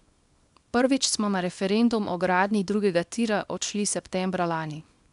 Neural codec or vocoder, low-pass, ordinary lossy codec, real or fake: codec, 24 kHz, 0.9 kbps, WavTokenizer, small release; 10.8 kHz; none; fake